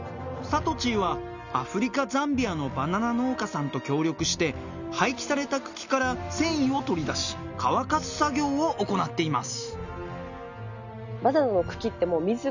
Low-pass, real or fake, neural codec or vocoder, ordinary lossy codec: 7.2 kHz; real; none; none